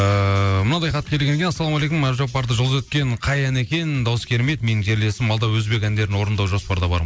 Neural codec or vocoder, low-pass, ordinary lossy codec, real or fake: none; none; none; real